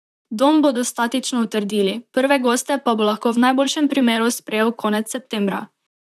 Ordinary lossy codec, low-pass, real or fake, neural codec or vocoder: none; 14.4 kHz; fake; vocoder, 44.1 kHz, 128 mel bands, Pupu-Vocoder